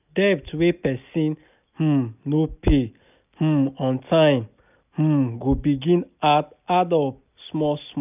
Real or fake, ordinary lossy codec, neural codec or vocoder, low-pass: real; none; none; 3.6 kHz